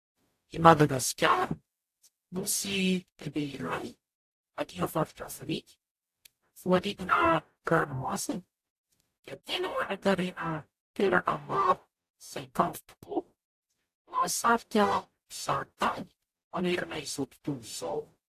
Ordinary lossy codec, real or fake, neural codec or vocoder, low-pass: AAC, 64 kbps; fake; codec, 44.1 kHz, 0.9 kbps, DAC; 14.4 kHz